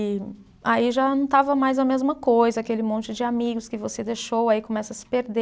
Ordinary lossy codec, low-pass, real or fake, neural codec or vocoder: none; none; real; none